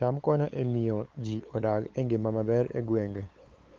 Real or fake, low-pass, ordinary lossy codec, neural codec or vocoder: fake; 7.2 kHz; Opus, 32 kbps; codec, 16 kHz, 8 kbps, FunCodec, trained on LibriTTS, 25 frames a second